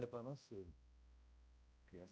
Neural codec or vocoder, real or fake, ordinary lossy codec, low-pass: codec, 16 kHz, 0.5 kbps, X-Codec, HuBERT features, trained on balanced general audio; fake; none; none